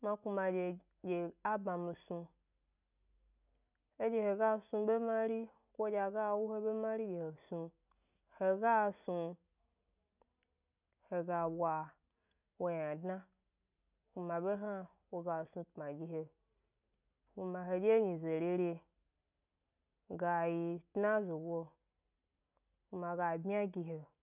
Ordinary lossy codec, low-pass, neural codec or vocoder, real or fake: none; 3.6 kHz; none; real